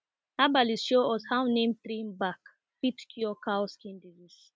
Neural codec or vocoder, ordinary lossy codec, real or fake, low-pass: none; none; real; none